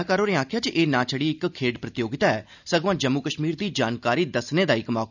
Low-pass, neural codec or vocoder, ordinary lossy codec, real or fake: 7.2 kHz; none; none; real